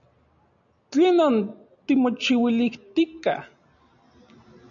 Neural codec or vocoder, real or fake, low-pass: none; real; 7.2 kHz